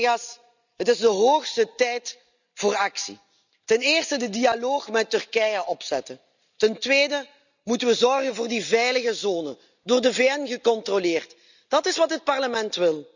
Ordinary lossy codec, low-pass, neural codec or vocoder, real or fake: none; 7.2 kHz; none; real